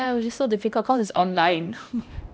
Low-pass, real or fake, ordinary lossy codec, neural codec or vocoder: none; fake; none; codec, 16 kHz, 1 kbps, X-Codec, HuBERT features, trained on LibriSpeech